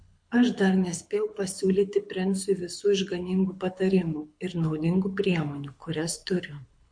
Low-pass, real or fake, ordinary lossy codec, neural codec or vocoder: 9.9 kHz; fake; MP3, 48 kbps; codec, 24 kHz, 6 kbps, HILCodec